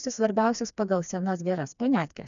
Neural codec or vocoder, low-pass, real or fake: codec, 16 kHz, 2 kbps, FreqCodec, smaller model; 7.2 kHz; fake